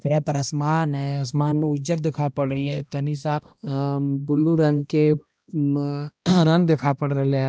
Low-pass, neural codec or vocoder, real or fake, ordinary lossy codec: none; codec, 16 kHz, 1 kbps, X-Codec, HuBERT features, trained on balanced general audio; fake; none